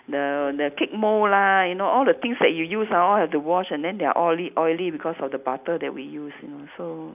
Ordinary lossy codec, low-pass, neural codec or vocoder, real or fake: none; 3.6 kHz; none; real